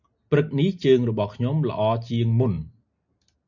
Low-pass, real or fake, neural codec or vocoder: 7.2 kHz; real; none